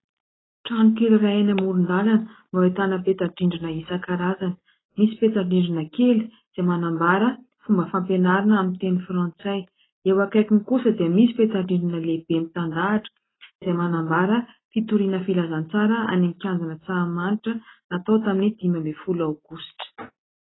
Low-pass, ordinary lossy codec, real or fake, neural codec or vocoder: 7.2 kHz; AAC, 16 kbps; real; none